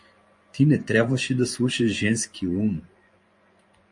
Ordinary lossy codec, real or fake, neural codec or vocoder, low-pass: MP3, 48 kbps; fake; vocoder, 24 kHz, 100 mel bands, Vocos; 10.8 kHz